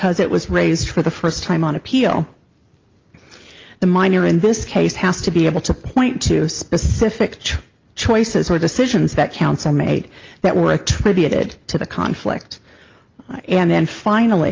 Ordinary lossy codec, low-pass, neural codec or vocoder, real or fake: Opus, 32 kbps; 7.2 kHz; none; real